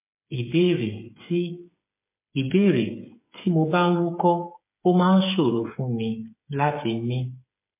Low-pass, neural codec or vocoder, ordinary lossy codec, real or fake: 3.6 kHz; codec, 16 kHz, 8 kbps, FreqCodec, smaller model; MP3, 24 kbps; fake